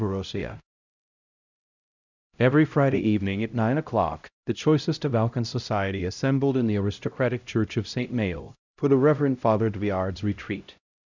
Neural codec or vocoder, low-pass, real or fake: codec, 16 kHz, 0.5 kbps, X-Codec, HuBERT features, trained on LibriSpeech; 7.2 kHz; fake